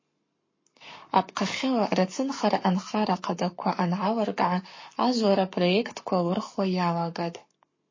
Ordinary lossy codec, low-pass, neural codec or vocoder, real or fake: MP3, 32 kbps; 7.2 kHz; codec, 44.1 kHz, 7.8 kbps, Pupu-Codec; fake